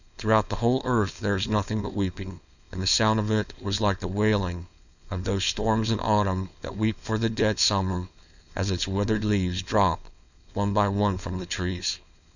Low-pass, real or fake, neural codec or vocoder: 7.2 kHz; fake; codec, 16 kHz, 4.8 kbps, FACodec